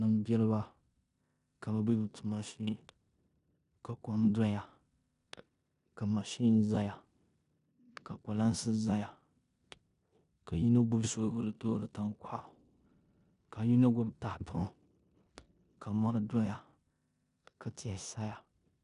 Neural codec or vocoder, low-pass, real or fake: codec, 16 kHz in and 24 kHz out, 0.9 kbps, LongCat-Audio-Codec, four codebook decoder; 10.8 kHz; fake